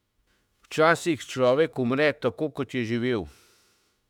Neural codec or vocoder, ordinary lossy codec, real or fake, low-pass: autoencoder, 48 kHz, 32 numbers a frame, DAC-VAE, trained on Japanese speech; none; fake; 19.8 kHz